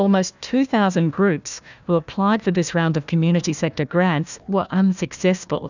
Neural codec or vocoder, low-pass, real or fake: codec, 16 kHz, 1 kbps, FunCodec, trained on Chinese and English, 50 frames a second; 7.2 kHz; fake